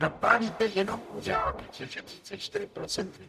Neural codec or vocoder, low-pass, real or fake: codec, 44.1 kHz, 0.9 kbps, DAC; 14.4 kHz; fake